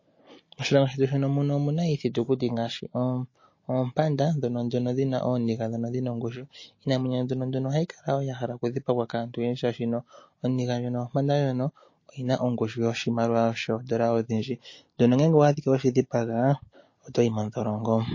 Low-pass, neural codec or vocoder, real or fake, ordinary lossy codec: 7.2 kHz; none; real; MP3, 32 kbps